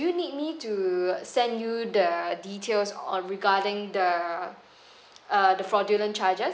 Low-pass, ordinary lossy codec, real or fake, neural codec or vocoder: none; none; real; none